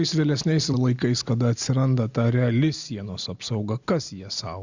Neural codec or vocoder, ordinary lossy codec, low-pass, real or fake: none; Opus, 64 kbps; 7.2 kHz; real